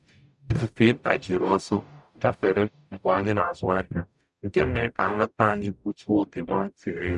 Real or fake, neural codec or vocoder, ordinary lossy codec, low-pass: fake; codec, 44.1 kHz, 0.9 kbps, DAC; none; 10.8 kHz